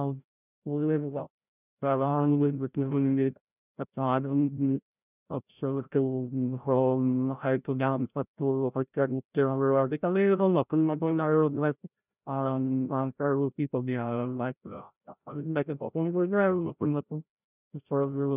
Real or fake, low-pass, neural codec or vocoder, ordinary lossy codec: fake; 3.6 kHz; codec, 16 kHz, 0.5 kbps, FreqCodec, larger model; none